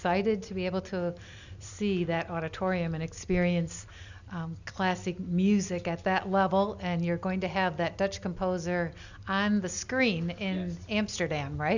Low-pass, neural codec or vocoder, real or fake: 7.2 kHz; none; real